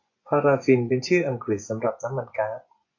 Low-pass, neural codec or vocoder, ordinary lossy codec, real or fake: 7.2 kHz; vocoder, 24 kHz, 100 mel bands, Vocos; AAC, 48 kbps; fake